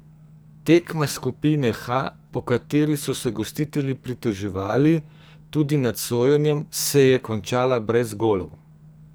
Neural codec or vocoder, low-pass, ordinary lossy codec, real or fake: codec, 44.1 kHz, 2.6 kbps, SNAC; none; none; fake